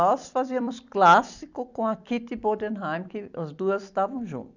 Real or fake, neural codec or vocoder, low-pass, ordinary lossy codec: fake; vocoder, 44.1 kHz, 128 mel bands every 256 samples, BigVGAN v2; 7.2 kHz; none